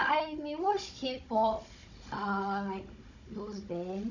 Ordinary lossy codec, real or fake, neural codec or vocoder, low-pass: none; fake; codec, 16 kHz, 16 kbps, FunCodec, trained on Chinese and English, 50 frames a second; 7.2 kHz